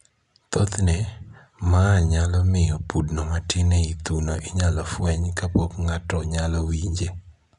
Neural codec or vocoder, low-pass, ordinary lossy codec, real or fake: none; 10.8 kHz; none; real